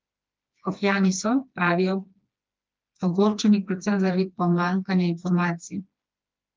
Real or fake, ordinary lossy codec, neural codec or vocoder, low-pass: fake; Opus, 32 kbps; codec, 16 kHz, 2 kbps, FreqCodec, smaller model; 7.2 kHz